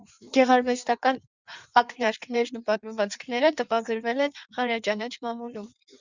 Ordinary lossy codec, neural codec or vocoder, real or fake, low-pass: Opus, 64 kbps; codec, 16 kHz in and 24 kHz out, 1.1 kbps, FireRedTTS-2 codec; fake; 7.2 kHz